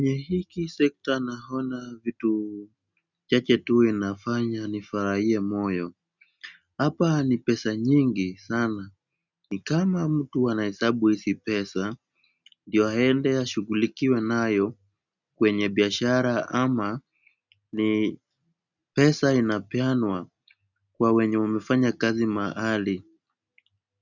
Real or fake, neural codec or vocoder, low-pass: real; none; 7.2 kHz